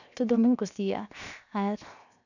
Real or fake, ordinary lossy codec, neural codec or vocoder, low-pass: fake; none; codec, 16 kHz, 0.7 kbps, FocalCodec; 7.2 kHz